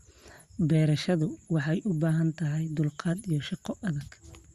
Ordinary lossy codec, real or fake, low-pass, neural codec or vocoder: Opus, 64 kbps; real; 14.4 kHz; none